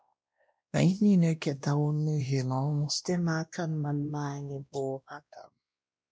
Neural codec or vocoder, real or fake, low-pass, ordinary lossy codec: codec, 16 kHz, 1 kbps, X-Codec, WavLM features, trained on Multilingual LibriSpeech; fake; none; none